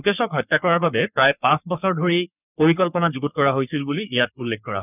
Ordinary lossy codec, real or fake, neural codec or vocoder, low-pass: none; fake; codec, 44.1 kHz, 3.4 kbps, Pupu-Codec; 3.6 kHz